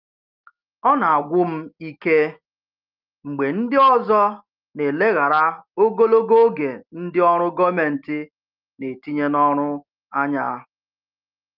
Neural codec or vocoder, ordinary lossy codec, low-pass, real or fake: none; Opus, 32 kbps; 5.4 kHz; real